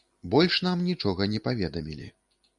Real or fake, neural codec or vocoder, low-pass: real; none; 10.8 kHz